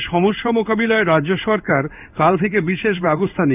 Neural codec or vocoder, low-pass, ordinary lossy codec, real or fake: codec, 16 kHz in and 24 kHz out, 1 kbps, XY-Tokenizer; 3.6 kHz; none; fake